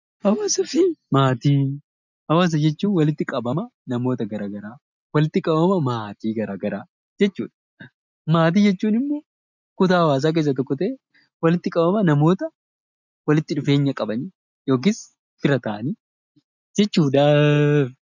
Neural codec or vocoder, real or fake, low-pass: none; real; 7.2 kHz